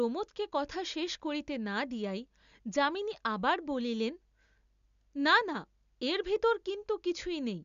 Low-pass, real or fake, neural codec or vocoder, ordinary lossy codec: 7.2 kHz; real; none; none